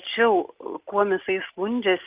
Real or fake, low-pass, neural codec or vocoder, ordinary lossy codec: real; 3.6 kHz; none; Opus, 32 kbps